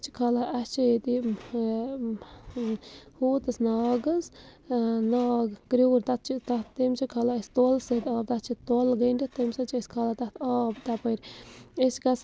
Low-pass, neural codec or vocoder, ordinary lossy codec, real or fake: none; none; none; real